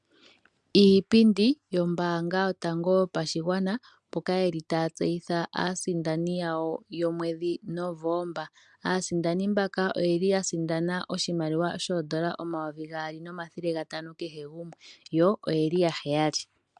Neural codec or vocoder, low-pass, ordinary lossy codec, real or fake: none; 10.8 kHz; MP3, 96 kbps; real